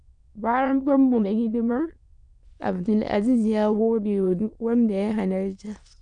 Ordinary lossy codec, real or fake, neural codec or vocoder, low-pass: none; fake; autoencoder, 22.05 kHz, a latent of 192 numbers a frame, VITS, trained on many speakers; 9.9 kHz